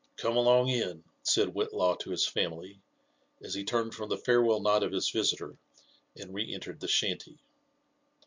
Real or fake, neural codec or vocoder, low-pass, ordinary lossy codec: real; none; 7.2 kHz; MP3, 64 kbps